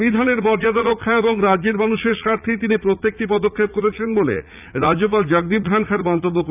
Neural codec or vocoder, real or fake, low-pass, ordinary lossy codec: vocoder, 44.1 kHz, 80 mel bands, Vocos; fake; 3.6 kHz; none